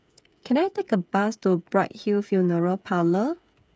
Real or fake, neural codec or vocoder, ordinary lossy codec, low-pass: fake; codec, 16 kHz, 8 kbps, FreqCodec, smaller model; none; none